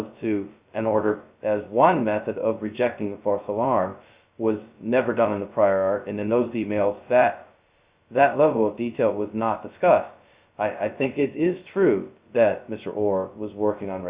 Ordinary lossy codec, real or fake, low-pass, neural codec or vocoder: Opus, 64 kbps; fake; 3.6 kHz; codec, 16 kHz, 0.2 kbps, FocalCodec